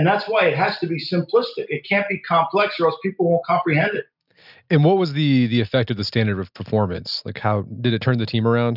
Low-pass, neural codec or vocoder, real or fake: 5.4 kHz; none; real